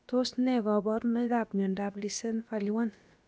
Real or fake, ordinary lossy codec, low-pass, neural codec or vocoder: fake; none; none; codec, 16 kHz, about 1 kbps, DyCAST, with the encoder's durations